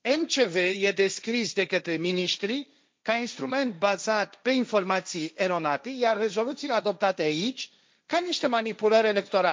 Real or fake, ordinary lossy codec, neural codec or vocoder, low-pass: fake; none; codec, 16 kHz, 1.1 kbps, Voila-Tokenizer; none